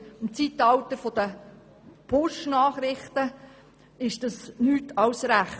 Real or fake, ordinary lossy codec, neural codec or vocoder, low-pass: real; none; none; none